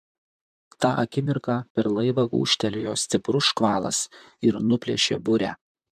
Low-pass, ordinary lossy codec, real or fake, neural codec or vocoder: 14.4 kHz; MP3, 96 kbps; fake; vocoder, 44.1 kHz, 128 mel bands, Pupu-Vocoder